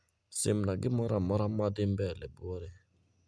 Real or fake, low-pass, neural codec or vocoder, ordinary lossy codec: real; none; none; none